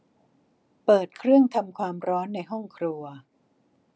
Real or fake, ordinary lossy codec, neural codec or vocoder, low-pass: real; none; none; none